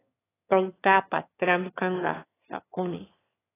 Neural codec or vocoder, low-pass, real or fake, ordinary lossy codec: autoencoder, 22.05 kHz, a latent of 192 numbers a frame, VITS, trained on one speaker; 3.6 kHz; fake; AAC, 16 kbps